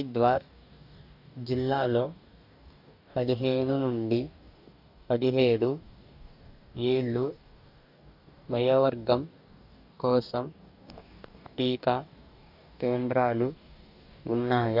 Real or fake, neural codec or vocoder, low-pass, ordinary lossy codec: fake; codec, 44.1 kHz, 2.6 kbps, DAC; 5.4 kHz; none